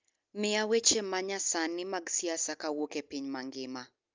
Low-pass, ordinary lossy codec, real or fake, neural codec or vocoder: 7.2 kHz; Opus, 24 kbps; real; none